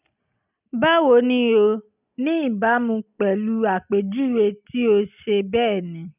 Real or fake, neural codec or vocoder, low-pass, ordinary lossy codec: real; none; 3.6 kHz; none